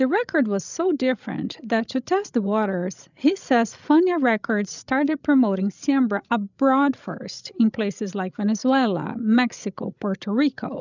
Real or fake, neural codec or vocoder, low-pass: fake; codec, 16 kHz, 16 kbps, FreqCodec, larger model; 7.2 kHz